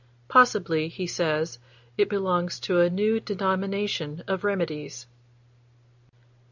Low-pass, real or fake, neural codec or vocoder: 7.2 kHz; real; none